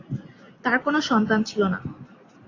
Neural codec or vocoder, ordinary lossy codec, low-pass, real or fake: none; AAC, 32 kbps; 7.2 kHz; real